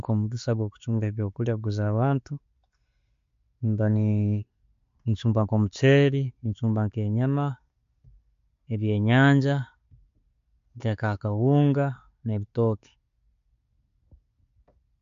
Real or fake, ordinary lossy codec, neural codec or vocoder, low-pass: real; MP3, 48 kbps; none; 7.2 kHz